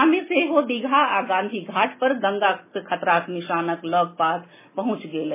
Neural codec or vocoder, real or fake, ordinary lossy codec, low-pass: none; real; MP3, 16 kbps; 3.6 kHz